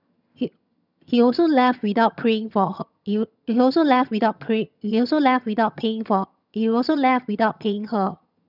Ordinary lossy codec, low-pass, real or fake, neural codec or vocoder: none; 5.4 kHz; fake; vocoder, 22.05 kHz, 80 mel bands, HiFi-GAN